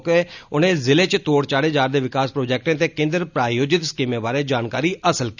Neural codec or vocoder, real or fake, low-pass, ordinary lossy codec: none; real; 7.2 kHz; none